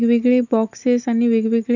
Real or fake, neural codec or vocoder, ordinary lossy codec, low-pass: real; none; none; 7.2 kHz